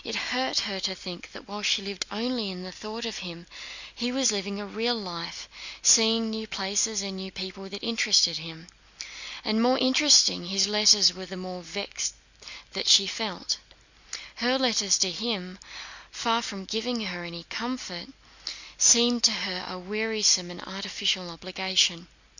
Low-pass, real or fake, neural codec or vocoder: 7.2 kHz; real; none